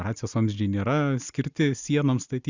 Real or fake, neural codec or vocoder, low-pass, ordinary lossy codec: real; none; 7.2 kHz; Opus, 64 kbps